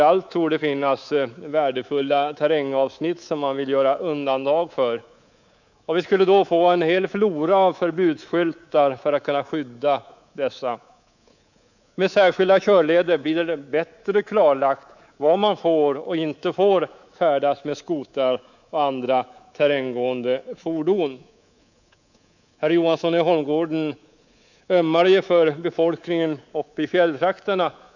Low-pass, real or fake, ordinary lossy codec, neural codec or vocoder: 7.2 kHz; fake; none; codec, 24 kHz, 3.1 kbps, DualCodec